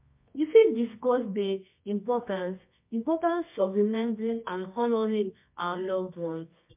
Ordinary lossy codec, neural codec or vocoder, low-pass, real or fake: MP3, 32 kbps; codec, 24 kHz, 0.9 kbps, WavTokenizer, medium music audio release; 3.6 kHz; fake